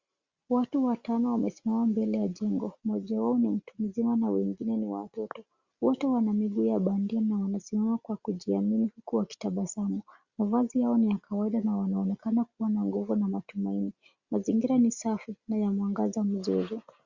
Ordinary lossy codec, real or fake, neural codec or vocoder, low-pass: Opus, 64 kbps; real; none; 7.2 kHz